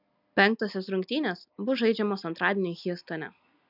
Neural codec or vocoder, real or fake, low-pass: none; real; 5.4 kHz